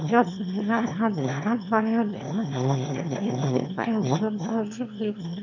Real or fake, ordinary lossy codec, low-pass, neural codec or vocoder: fake; none; 7.2 kHz; autoencoder, 22.05 kHz, a latent of 192 numbers a frame, VITS, trained on one speaker